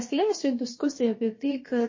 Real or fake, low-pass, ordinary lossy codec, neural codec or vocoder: fake; 7.2 kHz; MP3, 32 kbps; codec, 16 kHz, about 1 kbps, DyCAST, with the encoder's durations